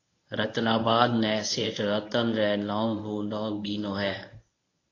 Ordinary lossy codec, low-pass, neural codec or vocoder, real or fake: AAC, 32 kbps; 7.2 kHz; codec, 24 kHz, 0.9 kbps, WavTokenizer, medium speech release version 1; fake